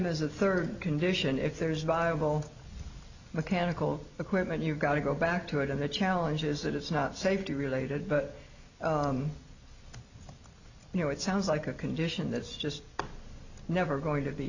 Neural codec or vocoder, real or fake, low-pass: none; real; 7.2 kHz